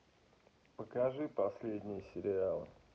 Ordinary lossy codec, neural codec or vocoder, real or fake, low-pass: none; none; real; none